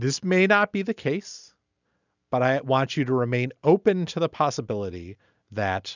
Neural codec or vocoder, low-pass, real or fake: none; 7.2 kHz; real